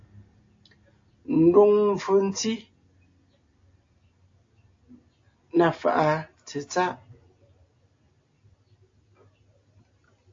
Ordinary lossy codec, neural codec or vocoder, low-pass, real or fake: AAC, 48 kbps; none; 7.2 kHz; real